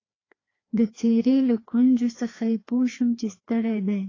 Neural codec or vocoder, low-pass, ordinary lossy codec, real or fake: codec, 16 kHz, 2 kbps, FreqCodec, larger model; 7.2 kHz; AAC, 32 kbps; fake